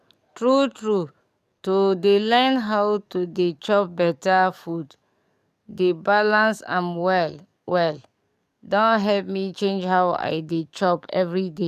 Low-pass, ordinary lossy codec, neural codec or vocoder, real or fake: 14.4 kHz; none; codec, 44.1 kHz, 7.8 kbps, DAC; fake